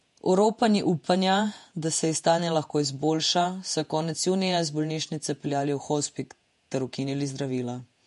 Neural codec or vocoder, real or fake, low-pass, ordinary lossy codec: vocoder, 48 kHz, 128 mel bands, Vocos; fake; 14.4 kHz; MP3, 48 kbps